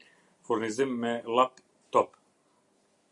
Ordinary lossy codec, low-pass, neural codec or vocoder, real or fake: Opus, 64 kbps; 10.8 kHz; none; real